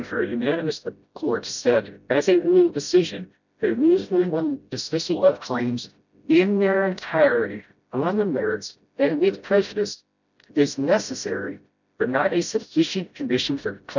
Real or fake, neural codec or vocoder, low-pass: fake; codec, 16 kHz, 0.5 kbps, FreqCodec, smaller model; 7.2 kHz